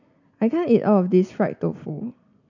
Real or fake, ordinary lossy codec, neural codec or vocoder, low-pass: real; none; none; 7.2 kHz